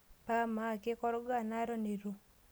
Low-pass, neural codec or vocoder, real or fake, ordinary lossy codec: none; none; real; none